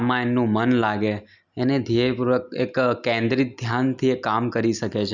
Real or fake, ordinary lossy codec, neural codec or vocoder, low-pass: real; none; none; 7.2 kHz